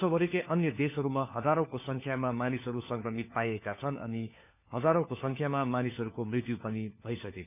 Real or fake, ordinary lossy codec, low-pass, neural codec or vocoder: fake; none; 3.6 kHz; codec, 16 kHz, 4 kbps, FunCodec, trained on LibriTTS, 50 frames a second